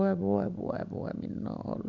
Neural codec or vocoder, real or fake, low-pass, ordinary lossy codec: none; real; 7.2 kHz; none